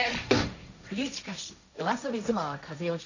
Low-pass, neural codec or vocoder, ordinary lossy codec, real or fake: 7.2 kHz; codec, 16 kHz, 1.1 kbps, Voila-Tokenizer; none; fake